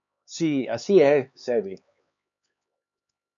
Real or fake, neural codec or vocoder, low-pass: fake; codec, 16 kHz, 4 kbps, X-Codec, HuBERT features, trained on LibriSpeech; 7.2 kHz